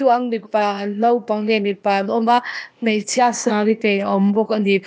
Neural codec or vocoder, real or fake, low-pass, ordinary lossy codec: codec, 16 kHz, 0.8 kbps, ZipCodec; fake; none; none